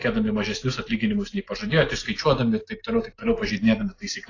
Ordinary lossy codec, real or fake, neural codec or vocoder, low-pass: AAC, 32 kbps; real; none; 7.2 kHz